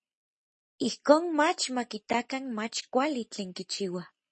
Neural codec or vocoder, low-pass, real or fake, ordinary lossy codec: none; 10.8 kHz; real; MP3, 32 kbps